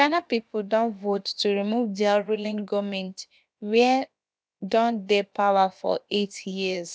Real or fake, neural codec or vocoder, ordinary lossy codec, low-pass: fake; codec, 16 kHz, about 1 kbps, DyCAST, with the encoder's durations; none; none